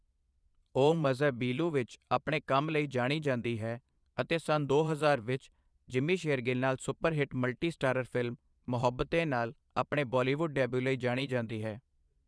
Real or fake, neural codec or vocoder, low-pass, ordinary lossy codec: fake; vocoder, 22.05 kHz, 80 mel bands, Vocos; none; none